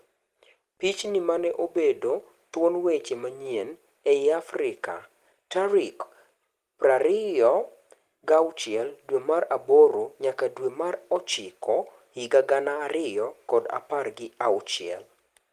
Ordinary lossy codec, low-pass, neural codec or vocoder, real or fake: Opus, 24 kbps; 14.4 kHz; none; real